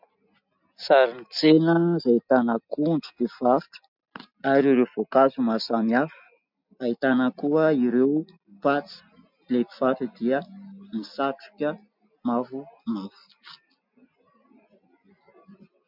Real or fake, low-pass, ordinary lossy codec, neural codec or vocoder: real; 5.4 kHz; MP3, 48 kbps; none